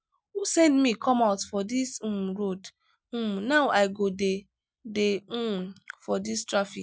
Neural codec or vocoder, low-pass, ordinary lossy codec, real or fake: none; none; none; real